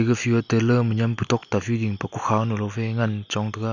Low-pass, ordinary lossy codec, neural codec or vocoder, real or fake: 7.2 kHz; none; none; real